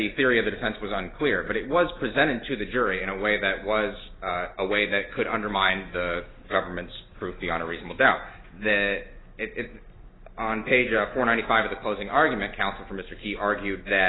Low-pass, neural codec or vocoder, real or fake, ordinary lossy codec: 7.2 kHz; none; real; AAC, 16 kbps